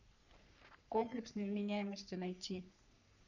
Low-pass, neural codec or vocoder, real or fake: 7.2 kHz; codec, 44.1 kHz, 3.4 kbps, Pupu-Codec; fake